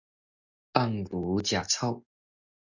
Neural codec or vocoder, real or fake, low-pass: none; real; 7.2 kHz